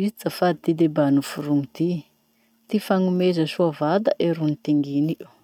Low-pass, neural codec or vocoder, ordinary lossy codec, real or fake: 19.8 kHz; none; none; real